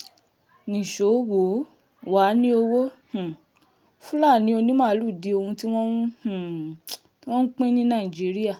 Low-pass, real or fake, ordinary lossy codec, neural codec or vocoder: 19.8 kHz; real; Opus, 32 kbps; none